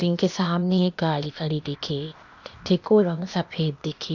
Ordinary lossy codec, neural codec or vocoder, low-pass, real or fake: none; codec, 16 kHz, 0.8 kbps, ZipCodec; 7.2 kHz; fake